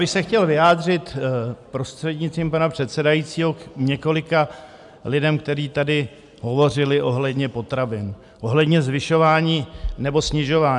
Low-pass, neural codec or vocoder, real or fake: 10.8 kHz; none; real